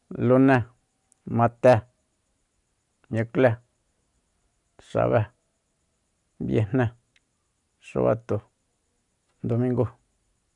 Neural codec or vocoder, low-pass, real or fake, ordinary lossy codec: none; 10.8 kHz; real; none